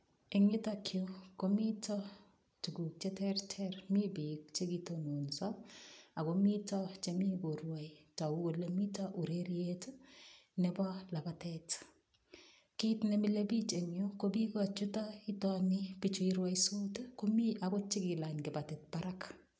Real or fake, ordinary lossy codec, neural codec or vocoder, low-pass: real; none; none; none